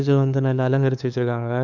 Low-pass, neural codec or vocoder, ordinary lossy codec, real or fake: 7.2 kHz; codec, 16 kHz, 2 kbps, FunCodec, trained on LibriTTS, 25 frames a second; none; fake